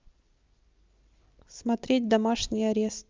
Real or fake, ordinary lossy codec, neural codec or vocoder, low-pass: real; Opus, 24 kbps; none; 7.2 kHz